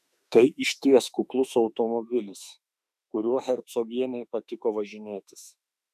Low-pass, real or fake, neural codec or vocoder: 14.4 kHz; fake; autoencoder, 48 kHz, 32 numbers a frame, DAC-VAE, trained on Japanese speech